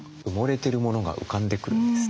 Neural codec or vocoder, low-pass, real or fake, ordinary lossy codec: none; none; real; none